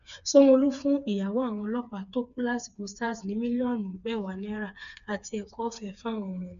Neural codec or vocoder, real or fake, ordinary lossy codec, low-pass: codec, 16 kHz, 4 kbps, FreqCodec, smaller model; fake; none; 7.2 kHz